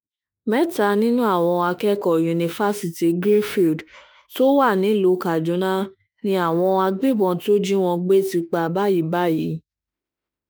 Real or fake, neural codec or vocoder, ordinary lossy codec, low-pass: fake; autoencoder, 48 kHz, 32 numbers a frame, DAC-VAE, trained on Japanese speech; none; none